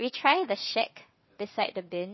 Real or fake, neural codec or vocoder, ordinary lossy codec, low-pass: fake; vocoder, 22.05 kHz, 80 mel bands, WaveNeXt; MP3, 24 kbps; 7.2 kHz